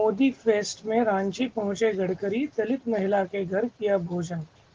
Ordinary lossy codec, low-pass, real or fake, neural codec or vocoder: Opus, 16 kbps; 7.2 kHz; real; none